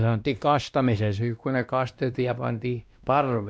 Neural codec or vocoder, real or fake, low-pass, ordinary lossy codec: codec, 16 kHz, 1 kbps, X-Codec, WavLM features, trained on Multilingual LibriSpeech; fake; none; none